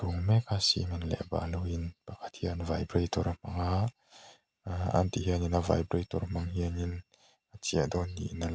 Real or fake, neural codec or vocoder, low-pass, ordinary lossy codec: real; none; none; none